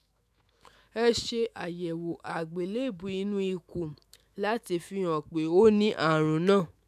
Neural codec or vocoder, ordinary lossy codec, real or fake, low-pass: autoencoder, 48 kHz, 128 numbers a frame, DAC-VAE, trained on Japanese speech; none; fake; 14.4 kHz